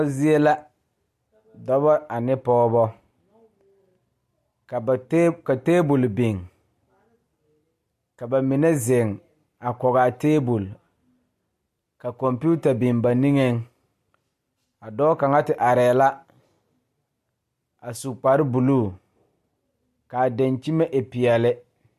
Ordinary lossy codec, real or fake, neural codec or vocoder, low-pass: MP3, 64 kbps; real; none; 14.4 kHz